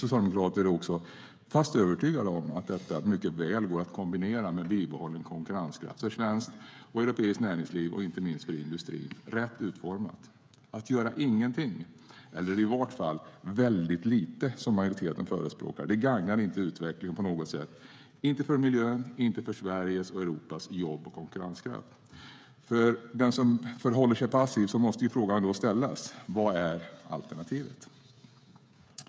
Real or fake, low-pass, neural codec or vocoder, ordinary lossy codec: fake; none; codec, 16 kHz, 16 kbps, FreqCodec, smaller model; none